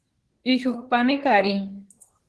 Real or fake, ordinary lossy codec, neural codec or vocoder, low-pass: fake; Opus, 16 kbps; codec, 24 kHz, 1 kbps, SNAC; 10.8 kHz